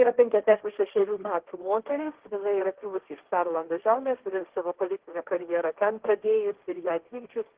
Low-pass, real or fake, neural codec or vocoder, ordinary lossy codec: 3.6 kHz; fake; codec, 16 kHz, 1.1 kbps, Voila-Tokenizer; Opus, 16 kbps